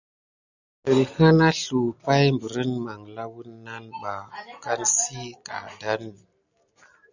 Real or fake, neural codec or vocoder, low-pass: real; none; 7.2 kHz